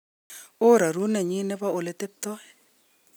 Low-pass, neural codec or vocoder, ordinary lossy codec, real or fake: none; none; none; real